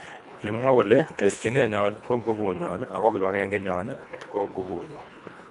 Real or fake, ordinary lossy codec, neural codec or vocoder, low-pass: fake; none; codec, 24 kHz, 1.5 kbps, HILCodec; 10.8 kHz